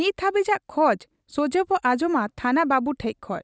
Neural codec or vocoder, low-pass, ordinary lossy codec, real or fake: none; none; none; real